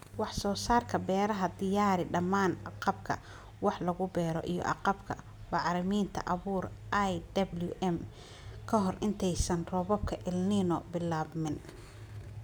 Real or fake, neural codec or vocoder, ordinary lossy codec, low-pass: real; none; none; none